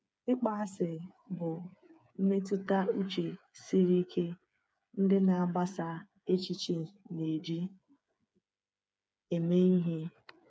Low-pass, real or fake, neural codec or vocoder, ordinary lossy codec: none; fake; codec, 16 kHz, 8 kbps, FreqCodec, smaller model; none